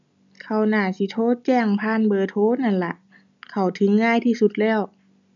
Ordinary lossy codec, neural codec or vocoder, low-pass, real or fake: none; none; 7.2 kHz; real